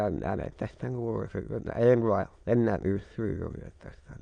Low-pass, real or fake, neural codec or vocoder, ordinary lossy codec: 9.9 kHz; fake; autoencoder, 22.05 kHz, a latent of 192 numbers a frame, VITS, trained on many speakers; none